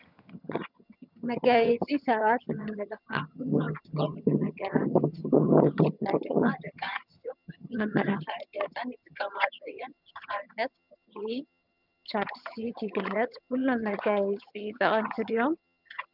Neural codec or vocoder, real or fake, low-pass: vocoder, 22.05 kHz, 80 mel bands, HiFi-GAN; fake; 5.4 kHz